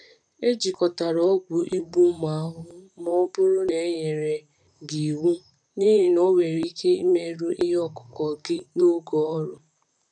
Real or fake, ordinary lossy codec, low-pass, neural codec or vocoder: fake; none; 9.9 kHz; vocoder, 44.1 kHz, 128 mel bands, Pupu-Vocoder